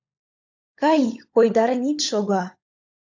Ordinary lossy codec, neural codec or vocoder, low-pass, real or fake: AAC, 48 kbps; codec, 16 kHz, 16 kbps, FunCodec, trained on LibriTTS, 50 frames a second; 7.2 kHz; fake